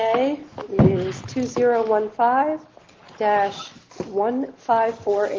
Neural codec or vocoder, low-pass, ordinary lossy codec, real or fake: none; 7.2 kHz; Opus, 16 kbps; real